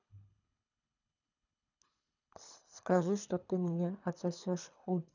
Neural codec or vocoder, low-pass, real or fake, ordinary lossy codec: codec, 24 kHz, 3 kbps, HILCodec; 7.2 kHz; fake; none